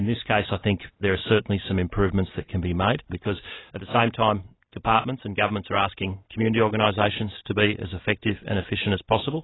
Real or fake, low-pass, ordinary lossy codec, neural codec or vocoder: real; 7.2 kHz; AAC, 16 kbps; none